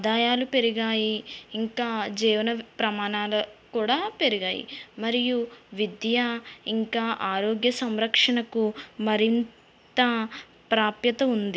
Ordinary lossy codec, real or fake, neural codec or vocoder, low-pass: none; real; none; none